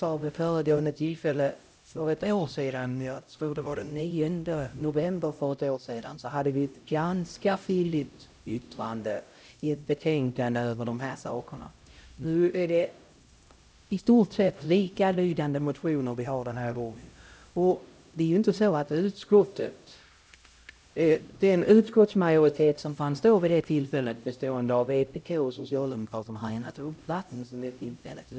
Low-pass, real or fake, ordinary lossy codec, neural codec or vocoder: none; fake; none; codec, 16 kHz, 0.5 kbps, X-Codec, HuBERT features, trained on LibriSpeech